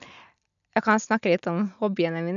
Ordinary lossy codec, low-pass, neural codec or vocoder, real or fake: none; 7.2 kHz; none; real